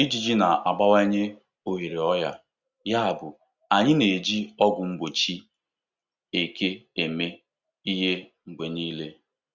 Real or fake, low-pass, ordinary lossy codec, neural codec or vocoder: real; 7.2 kHz; Opus, 64 kbps; none